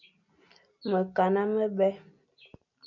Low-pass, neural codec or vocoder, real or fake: 7.2 kHz; none; real